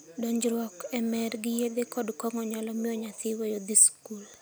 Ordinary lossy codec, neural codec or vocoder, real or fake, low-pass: none; none; real; none